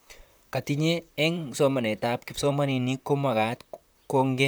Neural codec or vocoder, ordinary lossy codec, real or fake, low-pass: vocoder, 44.1 kHz, 128 mel bands, Pupu-Vocoder; none; fake; none